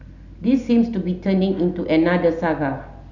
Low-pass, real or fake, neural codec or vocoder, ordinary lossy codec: 7.2 kHz; real; none; none